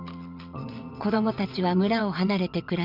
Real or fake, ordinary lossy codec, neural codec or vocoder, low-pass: fake; none; vocoder, 22.05 kHz, 80 mel bands, WaveNeXt; 5.4 kHz